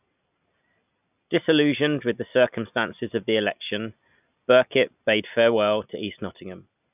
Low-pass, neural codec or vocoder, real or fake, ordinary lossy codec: 3.6 kHz; none; real; none